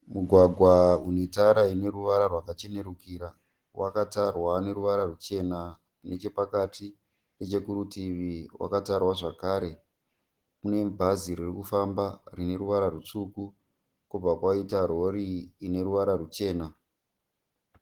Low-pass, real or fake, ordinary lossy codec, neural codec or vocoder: 19.8 kHz; real; Opus, 16 kbps; none